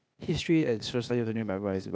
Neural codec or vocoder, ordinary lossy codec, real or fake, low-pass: codec, 16 kHz, 0.8 kbps, ZipCodec; none; fake; none